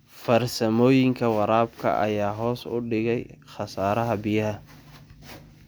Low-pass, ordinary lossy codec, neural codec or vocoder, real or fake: none; none; none; real